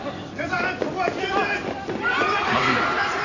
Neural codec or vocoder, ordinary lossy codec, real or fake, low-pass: none; none; real; 7.2 kHz